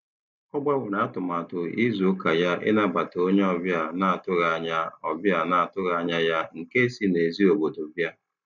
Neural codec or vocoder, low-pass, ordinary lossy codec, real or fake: none; 7.2 kHz; none; real